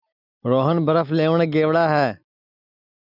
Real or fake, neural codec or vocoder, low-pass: real; none; 5.4 kHz